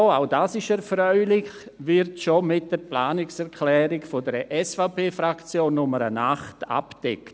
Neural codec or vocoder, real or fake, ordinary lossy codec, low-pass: none; real; none; none